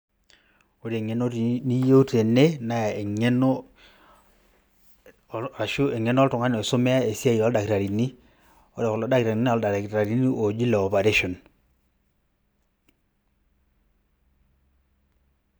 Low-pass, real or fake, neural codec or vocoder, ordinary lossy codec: none; real; none; none